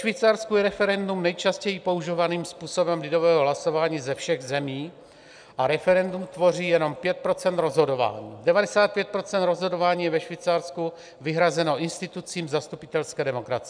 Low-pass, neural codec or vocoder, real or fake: 9.9 kHz; none; real